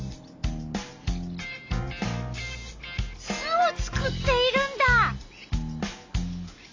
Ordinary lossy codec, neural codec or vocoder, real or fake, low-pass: AAC, 48 kbps; none; real; 7.2 kHz